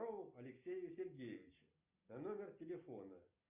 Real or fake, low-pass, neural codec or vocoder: real; 3.6 kHz; none